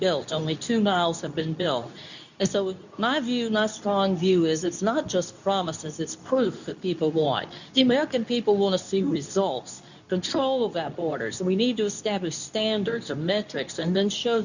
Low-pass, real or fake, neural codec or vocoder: 7.2 kHz; fake; codec, 24 kHz, 0.9 kbps, WavTokenizer, medium speech release version 2